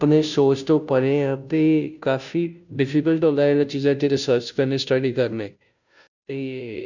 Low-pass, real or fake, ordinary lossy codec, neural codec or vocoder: 7.2 kHz; fake; none; codec, 16 kHz, 0.5 kbps, FunCodec, trained on Chinese and English, 25 frames a second